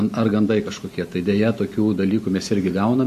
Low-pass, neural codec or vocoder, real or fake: 14.4 kHz; none; real